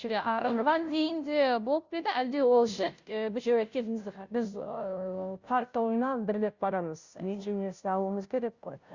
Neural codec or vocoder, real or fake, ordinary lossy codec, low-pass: codec, 16 kHz, 0.5 kbps, FunCodec, trained on Chinese and English, 25 frames a second; fake; Opus, 64 kbps; 7.2 kHz